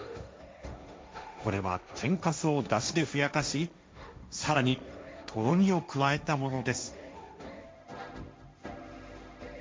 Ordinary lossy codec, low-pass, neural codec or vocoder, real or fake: none; none; codec, 16 kHz, 1.1 kbps, Voila-Tokenizer; fake